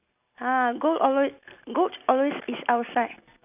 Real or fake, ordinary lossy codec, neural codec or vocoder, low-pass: real; none; none; 3.6 kHz